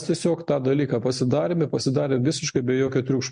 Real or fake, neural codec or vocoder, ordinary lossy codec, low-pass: real; none; MP3, 64 kbps; 9.9 kHz